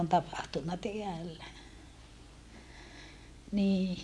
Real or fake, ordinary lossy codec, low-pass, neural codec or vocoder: fake; none; none; vocoder, 24 kHz, 100 mel bands, Vocos